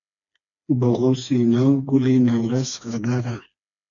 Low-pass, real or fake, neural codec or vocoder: 7.2 kHz; fake; codec, 16 kHz, 2 kbps, FreqCodec, smaller model